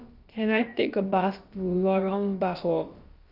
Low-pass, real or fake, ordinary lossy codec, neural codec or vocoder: 5.4 kHz; fake; Opus, 32 kbps; codec, 16 kHz, about 1 kbps, DyCAST, with the encoder's durations